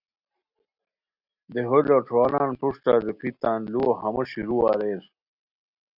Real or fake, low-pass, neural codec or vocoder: real; 5.4 kHz; none